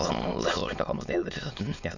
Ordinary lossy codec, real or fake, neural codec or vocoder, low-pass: none; fake; autoencoder, 22.05 kHz, a latent of 192 numbers a frame, VITS, trained on many speakers; 7.2 kHz